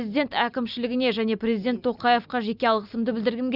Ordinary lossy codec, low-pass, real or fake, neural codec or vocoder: none; 5.4 kHz; real; none